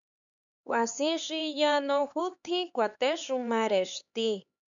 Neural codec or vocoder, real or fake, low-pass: codec, 16 kHz, 4 kbps, X-Codec, HuBERT features, trained on LibriSpeech; fake; 7.2 kHz